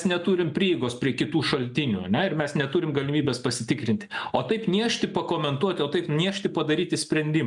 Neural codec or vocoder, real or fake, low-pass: none; real; 10.8 kHz